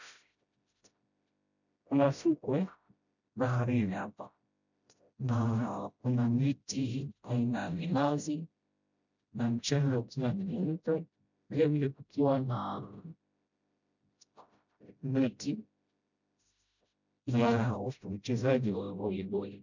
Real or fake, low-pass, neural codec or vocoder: fake; 7.2 kHz; codec, 16 kHz, 0.5 kbps, FreqCodec, smaller model